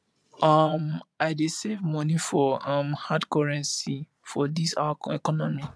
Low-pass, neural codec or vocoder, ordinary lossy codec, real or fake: none; vocoder, 22.05 kHz, 80 mel bands, Vocos; none; fake